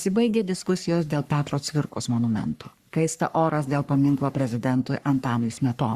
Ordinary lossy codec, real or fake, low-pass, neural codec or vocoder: Opus, 64 kbps; fake; 14.4 kHz; codec, 44.1 kHz, 3.4 kbps, Pupu-Codec